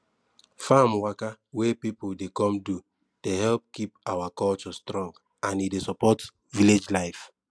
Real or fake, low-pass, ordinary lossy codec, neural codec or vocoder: real; none; none; none